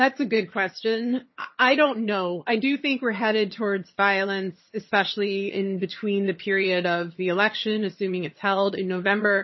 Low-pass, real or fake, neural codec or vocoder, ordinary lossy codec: 7.2 kHz; fake; vocoder, 22.05 kHz, 80 mel bands, HiFi-GAN; MP3, 24 kbps